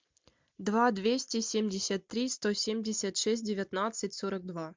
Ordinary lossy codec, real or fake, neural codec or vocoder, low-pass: MP3, 64 kbps; real; none; 7.2 kHz